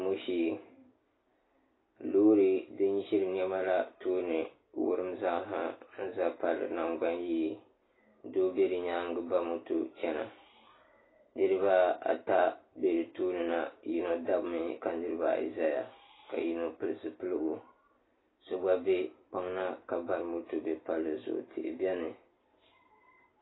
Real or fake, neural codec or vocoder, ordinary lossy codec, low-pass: real; none; AAC, 16 kbps; 7.2 kHz